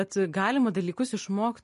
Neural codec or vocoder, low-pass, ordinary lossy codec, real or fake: none; 10.8 kHz; MP3, 48 kbps; real